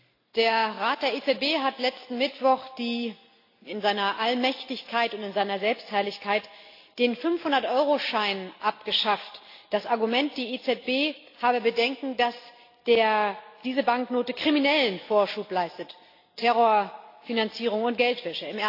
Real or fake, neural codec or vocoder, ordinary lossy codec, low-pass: real; none; AAC, 32 kbps; 5.4 kHz